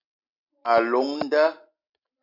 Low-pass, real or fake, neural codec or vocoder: 5.4 kHz; real; none